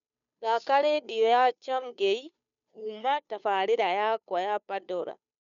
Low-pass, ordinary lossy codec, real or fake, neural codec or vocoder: 7.2 kHz; none; fake; codec, 16 kHz, 2 kbps, FunCodec, trained on Chinese and English, 25 frames a second